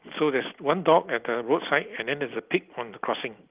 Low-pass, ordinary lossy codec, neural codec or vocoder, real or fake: 3.6 kHz; Opus, 24 kbps; none; real